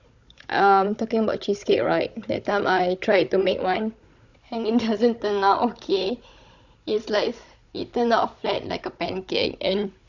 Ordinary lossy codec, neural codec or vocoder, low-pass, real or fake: Opus, 64 kbps; codec, 16 kHz, 16 kbps, FunCodec, trained on Chinese and English, 50 frames a second; 7.2 kHz; fake